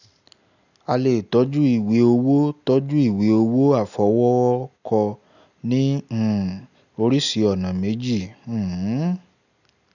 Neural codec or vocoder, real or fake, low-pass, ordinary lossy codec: none; real; 7.2 kHz; none